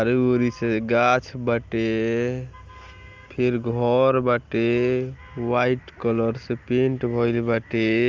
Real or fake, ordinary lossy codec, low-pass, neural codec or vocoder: real; Opus, 32 kbps; 7.2 kHz; none